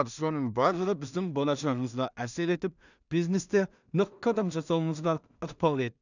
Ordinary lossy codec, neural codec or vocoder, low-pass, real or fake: none; codec, 16 kHz in and 24 kHz out, 0.4 kbps, LongCat-Audio-Codec, two codebook decoder; 7.2 kHz; fake